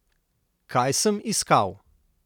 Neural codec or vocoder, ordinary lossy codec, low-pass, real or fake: none; none; none; real